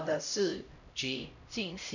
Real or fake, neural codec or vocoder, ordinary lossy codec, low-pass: fake; codec, 16 kHz, 0.5 kbps, X-Codec, HuBERT features, trained on LibriSpeech; none; 7.2 kHz